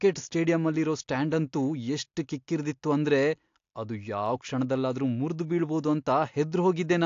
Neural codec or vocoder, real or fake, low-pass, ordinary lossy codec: none; real; 7.2 kHz; AAC, 48 kbps